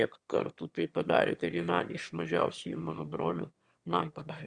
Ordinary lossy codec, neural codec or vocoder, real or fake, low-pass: Opus, 64 kbps; autoencoder, 22.05 kHz, a latent of 192 numbers a frame, VITS, trained on one speaker; fake; 9.9 kHz